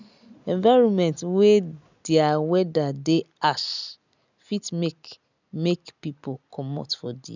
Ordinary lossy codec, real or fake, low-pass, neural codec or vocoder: none; real; 7.2 kHz; none